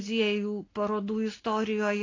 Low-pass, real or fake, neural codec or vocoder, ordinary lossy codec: 7.2 kHz; real; none; AAC, 32 kbps